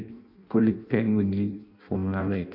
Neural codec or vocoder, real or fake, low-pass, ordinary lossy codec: codec, 16 kHz in and 24 kHz out, 0.6 kbps, FireRedTTS-2 codec; fake; 5.4 kHz; none